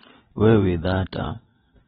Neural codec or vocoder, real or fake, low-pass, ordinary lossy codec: vocoder, 22.05 kHz, 80 mel bands, WaveNeXt; fake; 9.9 kHz; AAC, 16 kbps